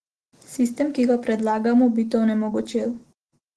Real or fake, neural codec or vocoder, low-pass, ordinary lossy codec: real; none; 10.8 kHz; Opus, 16 kbps